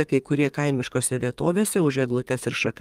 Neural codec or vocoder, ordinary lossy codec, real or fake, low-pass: codec, 32 kHz, 1.9 kbps, SNAC; Opus, 32 kbps; fake; 14.4 kHz